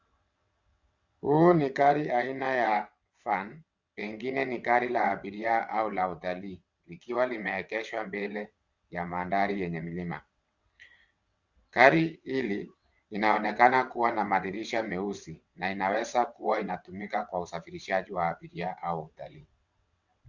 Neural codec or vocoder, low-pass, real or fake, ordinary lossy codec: vocoder, 22.05 kHz, 80 mel bands, WaveNeXt; 7.2 kHz; fake; Opus, 64 kbps